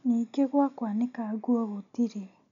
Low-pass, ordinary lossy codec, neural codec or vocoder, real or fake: 7.2 kHz; none; none; real